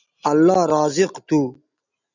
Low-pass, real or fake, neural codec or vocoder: 7.2 kHz; real; none